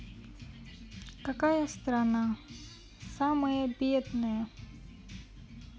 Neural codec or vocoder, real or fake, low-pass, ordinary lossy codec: none; real; none; none